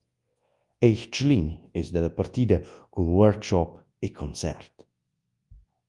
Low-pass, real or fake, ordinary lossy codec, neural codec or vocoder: 10.8 kHz; fake; Opus, 32 kbps; codec, 24 kHz, 0.9 kbps, WavTokenizer, large speech release